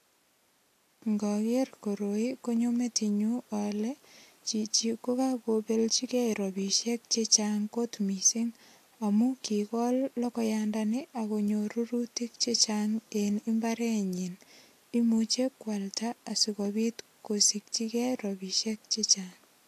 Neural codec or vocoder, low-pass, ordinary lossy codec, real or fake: none; 14.4 kHz; AAC, 64 kbps; real